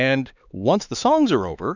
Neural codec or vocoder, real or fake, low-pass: codec, 16 kHz, 4 kbps, X-Codec, HuBERT features, trained on LibriSpeech; fake; 7.2 kHz